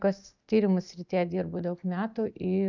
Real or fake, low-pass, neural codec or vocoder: real; 7.2 kHz; none